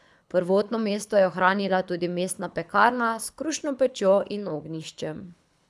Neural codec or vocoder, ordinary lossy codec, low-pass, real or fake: codec, 24 kHz, 6 kbps, HILCodec; none; none; fake